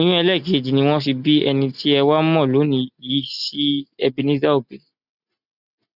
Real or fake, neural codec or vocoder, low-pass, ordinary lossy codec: real; none; 5.4 kHz; none